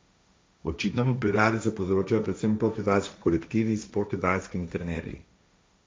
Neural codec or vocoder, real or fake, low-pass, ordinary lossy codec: codec, 16 kHz, 1.1 kbps, Voila-Tokenizer; fake; none; none